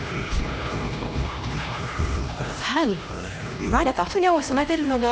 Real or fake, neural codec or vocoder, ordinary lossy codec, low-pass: fake; codec, 16 kHz, 1 kbps, X-Codec, HuBERT features, trained on LibriSpeech; none; none